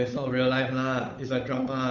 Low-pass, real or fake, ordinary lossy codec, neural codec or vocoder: 7.2 kHz; fake; none; codec, 16 kHz, 8 kbps, FunCodec, trained on Chinese and English, 25 frames a second